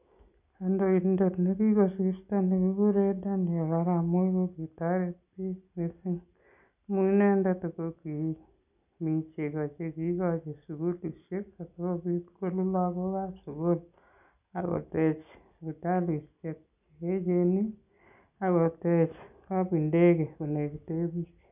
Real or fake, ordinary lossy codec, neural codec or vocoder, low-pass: real; none; none; 3.6 kHz